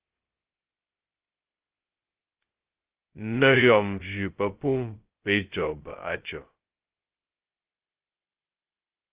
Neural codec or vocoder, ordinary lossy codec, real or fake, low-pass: codec, 16 kHz, 0.2 kbps, FocalCodec; Opus, 16 kbps; fake; 3.6 kHz